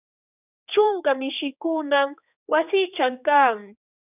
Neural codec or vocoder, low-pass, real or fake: codec, 16 kHz, 2 kbps, X-Codec, HuBERT features, trained on general audio; 3.6 kHz; fake